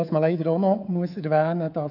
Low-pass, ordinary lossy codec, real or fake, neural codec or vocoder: 5.4 kHz; none; fake; codec, 24 kHz, 3.1 kbps, DualCodec